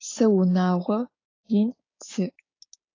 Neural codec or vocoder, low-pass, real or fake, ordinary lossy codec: codec, 16 kHz, 6 kbps, DAC; 7.2 kHz; fake; AAC, 32 kbps